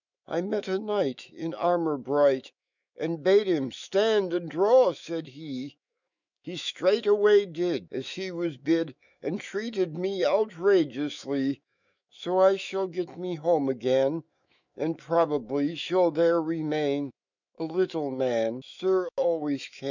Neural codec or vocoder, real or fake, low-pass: autoencoder, 48 kHz, 128 numbers a frame, DAC-VAE, trained on Japanese speech; fake; 7.2 kHz